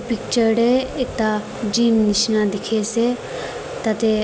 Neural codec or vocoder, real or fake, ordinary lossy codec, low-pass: none; real; none; none